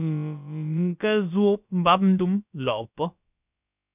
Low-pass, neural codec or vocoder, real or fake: 3.6 kHz; codec, 16 kHz, about 1 kbps, DyCAST, with the encoder's durations; fake